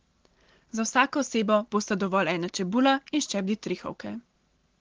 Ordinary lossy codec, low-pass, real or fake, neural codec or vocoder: Opus, 16 kbps; 7.2 kHz; real; none